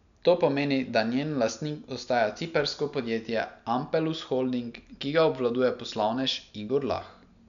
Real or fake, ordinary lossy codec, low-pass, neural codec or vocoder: real; none; 7.2 kHz; none